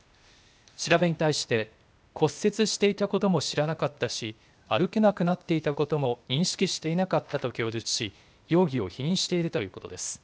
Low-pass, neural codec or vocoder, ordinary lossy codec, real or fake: none; codec, 16 kHz, 0.8 kbps, ZipCodec; none; fake